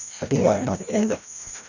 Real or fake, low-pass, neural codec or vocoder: fake; 7.2 kHz; codec, 16 kHz, 1 kbps, FreqCodec, larger model